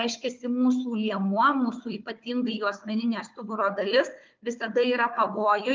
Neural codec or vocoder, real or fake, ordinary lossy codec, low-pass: codec, 16 kHz, 4 kbps, FunCodec, trained on Chinese and English, 50 frames a second; fake; Opus, 24 kbps; 7.2 kHz